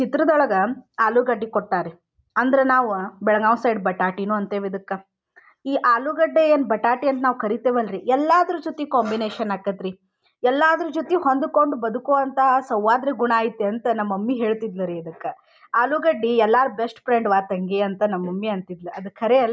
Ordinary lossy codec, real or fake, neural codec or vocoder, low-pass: none; real; none; none